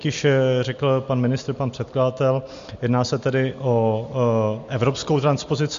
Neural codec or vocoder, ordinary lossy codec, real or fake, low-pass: none; MP3, 48 kbps; real; 7.2 kHz